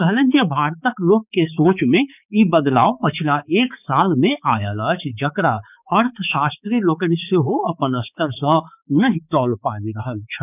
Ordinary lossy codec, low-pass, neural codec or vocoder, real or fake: none; 3.6 kHz; codec, 16 kHz, 4 kbps, X-Codec, HuBERT features, trained on balanced general audio; fake